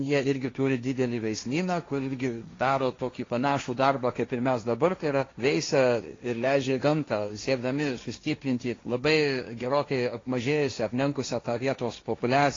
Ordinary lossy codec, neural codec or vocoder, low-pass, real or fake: AAC, 32 kbps; codec, 16 kHz, 1.1 kbps, Voila-Tokenizer; 7.2 kHz; fake